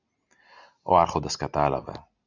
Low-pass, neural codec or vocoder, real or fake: 7.2 kHz; none; real